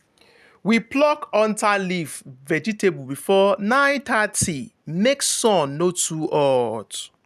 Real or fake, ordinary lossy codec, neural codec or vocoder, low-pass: real; none; none; 14.4 kHz